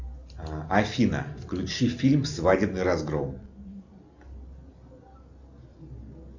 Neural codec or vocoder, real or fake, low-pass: none; real; 7.2 kHz